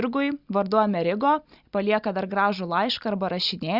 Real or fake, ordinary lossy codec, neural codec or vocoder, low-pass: real; Opus, 64 kbps; none; 5.4 kHz